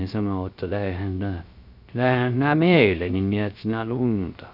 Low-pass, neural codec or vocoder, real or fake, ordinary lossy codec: 5.4 kHz; codec, 16 kHz, 0.3 kbps, FocalCodec; fake; none